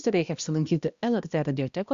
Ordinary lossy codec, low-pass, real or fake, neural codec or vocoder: Opus, 64 kbps; 7.2 kHz; fake; codec, 16 kHz, 1 kbps, X-Codec, HuBERT features, trained on balanced general audio